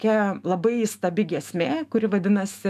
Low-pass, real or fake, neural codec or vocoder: 14.4 kHz; fake; autoencoder, 48 kHz, 128 numbers a frame, DAC-VAE, trained on Japanese speech